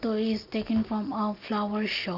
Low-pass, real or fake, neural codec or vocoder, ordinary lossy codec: 5.4 kHz; real; none; Opus, 32 kbps